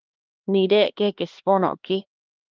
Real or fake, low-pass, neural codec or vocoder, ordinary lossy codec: fake; 7.2 kHz; codec, 16 kHz, 2 kbps, X-Codec, HuBERT features, trained on LibriSpeech; Opus, 32 kbps